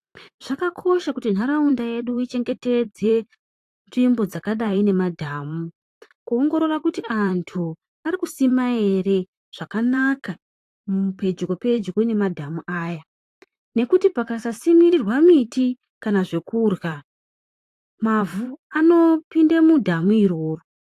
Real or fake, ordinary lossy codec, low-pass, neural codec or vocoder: fake; AAC, 64 kbps; 14.4 kHz; vocoder, 44.1 kHz, 128 mel bands, Pupu-Vocoder